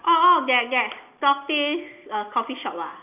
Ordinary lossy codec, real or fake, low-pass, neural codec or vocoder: none; real; 3.6 kHz; none